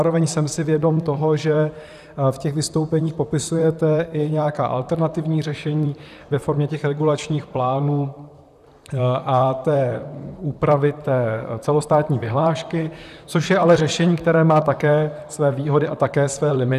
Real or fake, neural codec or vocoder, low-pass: fake; vocoder, 44.1 kHz, 128 mel bands, Pupu-Vocoder; 14.4 kHz